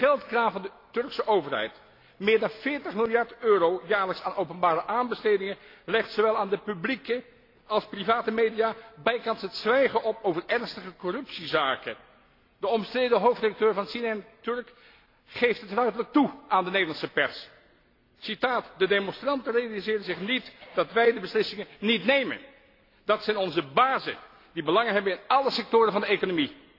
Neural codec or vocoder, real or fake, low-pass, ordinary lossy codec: none; real; 5.4 kHz; AAC, 32 kbps